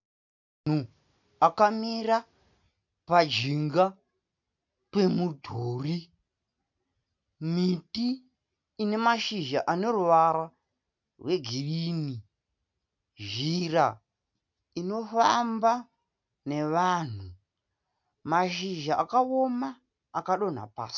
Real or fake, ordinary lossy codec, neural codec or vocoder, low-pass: real; MP3, 64 kbps; none; 7.2 kHz